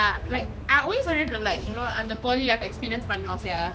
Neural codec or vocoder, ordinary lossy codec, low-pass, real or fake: codec, 16 kHz, 2 kbps, X-Codec, HuBERT features, trained on general audio; none; none; fake